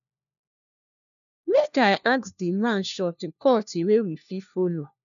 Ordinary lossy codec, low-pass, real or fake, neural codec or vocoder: none; 7.2 kHz; fake; codec, 16 kHz, 1 kbps, FunCodec, trained on LibriTTS, 50 frames a second